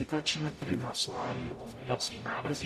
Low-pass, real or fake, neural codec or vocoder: 14.4 kHz; fake; codec, 44.1 kHz, 0.9 kbps, DAC